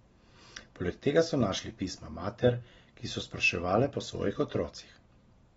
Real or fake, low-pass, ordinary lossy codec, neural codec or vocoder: real; 19.8 kHz; AAC, 24 kbps; none